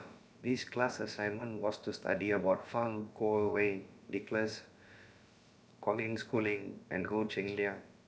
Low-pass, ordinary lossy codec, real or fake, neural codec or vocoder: none; none; fake; codec, 16 kHz, about 1 kbps, DyCAST, with the encoder's durations